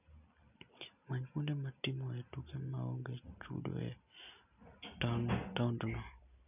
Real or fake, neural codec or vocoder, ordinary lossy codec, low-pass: real; none; none; 3.6 kHz